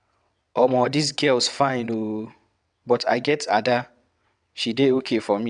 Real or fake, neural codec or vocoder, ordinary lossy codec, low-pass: fake; vocoder, 22.05 kHz, 80 mel bands, WaveNeXt; none; 9.9 kHz